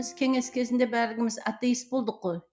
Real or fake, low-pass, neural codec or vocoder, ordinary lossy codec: real; none; none; none